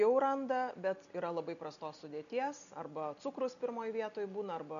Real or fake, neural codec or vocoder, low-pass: real; none; 7.2 kHz